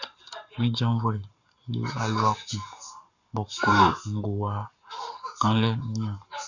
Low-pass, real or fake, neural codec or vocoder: 7.2 kHz; fake; codec, 44.1 kHz, 7.8 kbps, Pupu-Codec